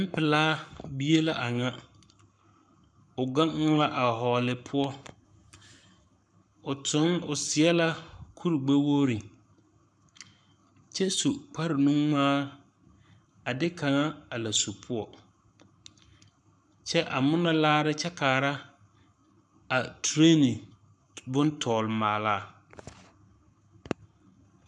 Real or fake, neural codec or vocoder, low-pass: fake; codec, 44.1 kHz, 7.8 kbps, Pupu-Codec; 9.9 kHz